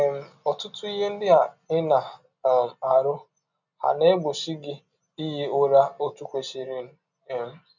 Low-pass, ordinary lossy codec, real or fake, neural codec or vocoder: 7.2 kHz; none; real; none